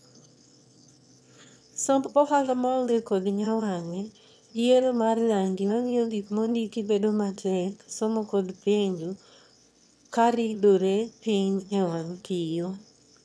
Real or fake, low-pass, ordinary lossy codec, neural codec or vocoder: fake; none; none; autoencoder, 22.05 kHz, a latent of 192 numbers a frame, VITS, trained on one speaker